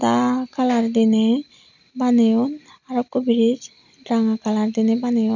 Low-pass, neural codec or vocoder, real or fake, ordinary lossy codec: 7.2 kHz; none; real; none